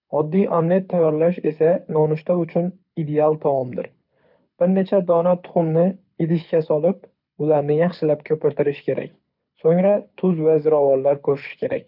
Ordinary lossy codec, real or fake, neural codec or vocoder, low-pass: none; fake; codec, 24 kHz, 6 kbps, HILCodec; 5.4 kHz